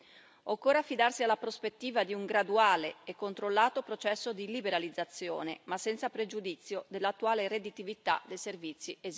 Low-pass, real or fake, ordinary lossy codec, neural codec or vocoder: none; real; none; none